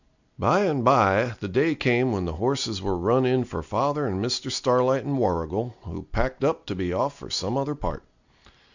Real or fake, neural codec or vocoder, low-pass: real; none; 7.2 kHz